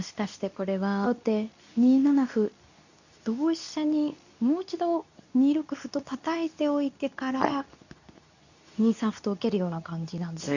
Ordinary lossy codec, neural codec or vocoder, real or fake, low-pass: none; codec, 24 kHz, 0.9 kbps, WavTokenizer, medium speech release version 2; fake; 7.2 kHz